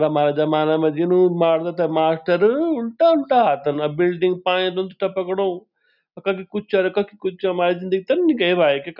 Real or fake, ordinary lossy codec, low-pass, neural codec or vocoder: real; none; 5.4 kHz; none